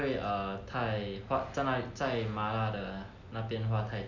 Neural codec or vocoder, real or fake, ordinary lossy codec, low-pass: none; real; none; 7.2 kHz